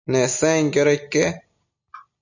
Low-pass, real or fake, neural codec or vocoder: 7.2 kHz; real; none